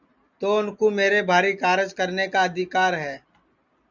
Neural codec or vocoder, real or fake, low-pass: none; real; 7.2 kHz